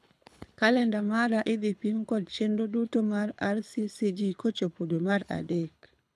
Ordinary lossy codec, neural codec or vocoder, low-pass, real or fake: none; codec, 24 kHz, 6 kbps, HILCodec; none; fake